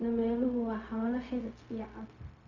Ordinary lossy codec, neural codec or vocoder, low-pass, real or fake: Opus, 64 kbps; codec, 16 kHz, 0.4 kbps, LongCat-Audio-Codec; 7.2 kHz; fake